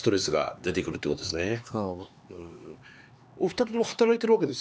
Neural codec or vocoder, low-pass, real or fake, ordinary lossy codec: codec, 16 kHz, 4 kbps, X-Codec, HuBERT features, trained on LibriSpeech; none; fake; none